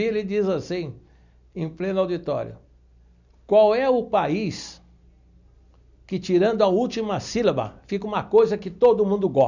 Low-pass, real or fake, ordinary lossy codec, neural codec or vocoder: 7.2 kHz; real; none; none